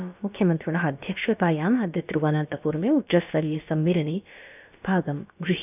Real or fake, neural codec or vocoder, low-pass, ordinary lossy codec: fake; codec, 16 kHz, about 1 kbps, DyCAST, with the encoder's durations; 3.6 kHz; none